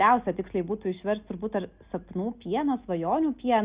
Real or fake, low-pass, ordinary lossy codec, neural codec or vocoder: real; 3.6 kHz; Opus, 64 kbps; none